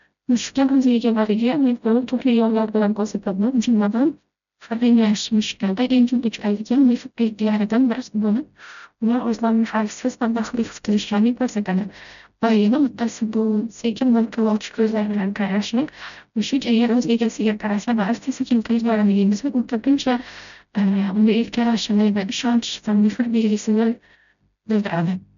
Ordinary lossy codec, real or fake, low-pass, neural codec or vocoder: none; fake; 7.2 kHz; codec, 16 kHz, 0.5 kbps, FreqCodec, smaller model